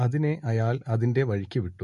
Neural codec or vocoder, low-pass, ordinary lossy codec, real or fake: none; 14.4 kHz; MP3, 48 kbps; real